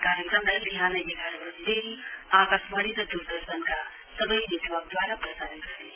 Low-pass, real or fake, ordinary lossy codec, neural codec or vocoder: 3.6 kHz; real; Opus, 32 kbps; none